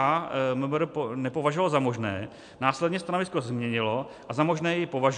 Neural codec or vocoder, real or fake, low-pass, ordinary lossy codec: none; real; 9.9 kHz; MP3, 64 kbps